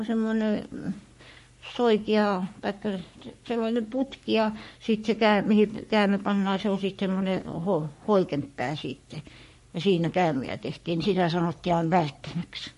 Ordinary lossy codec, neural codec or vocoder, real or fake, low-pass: MP3, 48 kbps; codec, 44.1 kHz, 3.4 kbps, Pupu-Codec; fake; 14.4 kHz